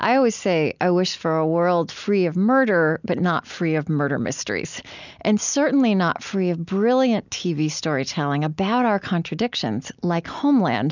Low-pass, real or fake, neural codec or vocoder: 7.2 kHz; real; none